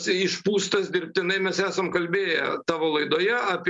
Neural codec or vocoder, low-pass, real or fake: none; 10.8 kHz; real